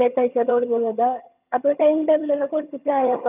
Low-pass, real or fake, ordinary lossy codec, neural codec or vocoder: 3.6 kHz; fake; none; vocoder, 22.05 kHz, 80 mel bands, HiFi-GAN